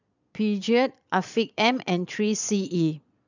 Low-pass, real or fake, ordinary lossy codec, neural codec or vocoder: 7.2 kHz; fake; none; vocoder, 22.05 kHz, 80 mel bands, Vocos